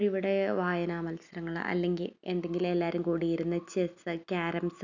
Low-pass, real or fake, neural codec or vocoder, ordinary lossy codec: 7.2 kHz; real; none; none